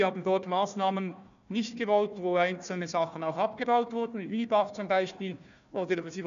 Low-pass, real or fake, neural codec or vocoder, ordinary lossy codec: 7.2 kHz; fake; codec, 16 kHz, 1 kbps, FunCodec, trained on Chinese and English, 50 frames a second; none